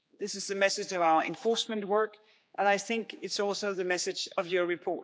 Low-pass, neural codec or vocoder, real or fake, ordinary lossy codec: none; codec, 16 kHz, 4 kbps, X-Codec, HuBERT features, trained on general audio; fake; none